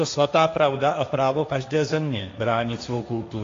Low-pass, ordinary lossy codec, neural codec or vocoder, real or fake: 7.2 kHz; AAC, 48 kbps; codec, 16 kHz, 1.1 kbps, Voila-Tokenizer; fake